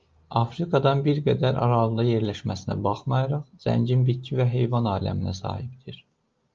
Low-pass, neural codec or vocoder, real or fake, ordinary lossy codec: 7.2 kHz; none; real; Opus, 24 kbps